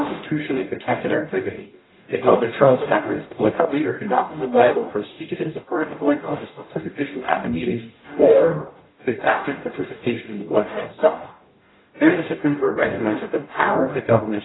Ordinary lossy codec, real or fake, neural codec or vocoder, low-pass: AAC, 16 kbps; fake; codec, 44.1 kHz, 0.9 kbps, DAC; 7.2 kHz